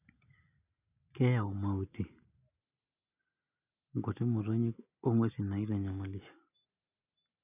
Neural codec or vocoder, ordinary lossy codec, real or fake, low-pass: none; none; real; 3.6 kHz